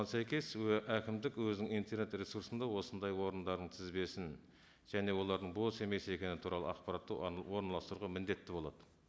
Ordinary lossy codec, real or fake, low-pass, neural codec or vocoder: none; real; none; none